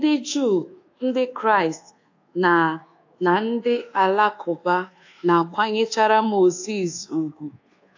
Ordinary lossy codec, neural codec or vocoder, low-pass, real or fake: AAC, 48 kbps; codec, 24 kHz, 1.2 kbps, DualCodec; 7.2 kHz; fake